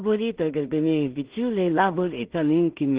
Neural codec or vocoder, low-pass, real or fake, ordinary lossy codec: codec, 16 kHz in and 24 kHz out, 0.4 kbps, LongCat-Audio-Codec, two codebook decoder; 3.6 kHz; fake; Opus, 16 kbps